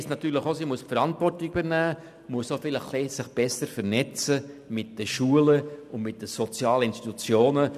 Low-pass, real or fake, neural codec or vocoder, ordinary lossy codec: 14.4 kHz; real; none; none